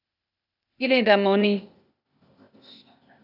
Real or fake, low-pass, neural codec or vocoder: fake; 5.4 kHz; codec, 16 kHz, 0.8 kbps, ZipCodec